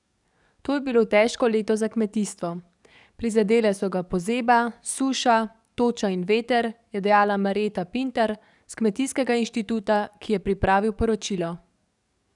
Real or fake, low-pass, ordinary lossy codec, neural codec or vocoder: fake; 10.8 kHz; none; codec, 44.1 kHz, 7.8 kbps, DAC